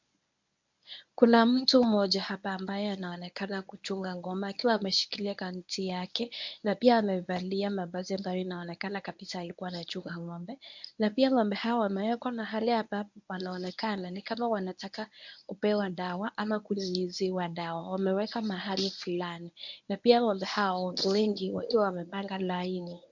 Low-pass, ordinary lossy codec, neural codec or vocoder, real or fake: 7.2 kHz; MP3, 64 kbps; codec, 24 kHz, 0.9 kbps, WavTokenizer, medium speech release version 1; fake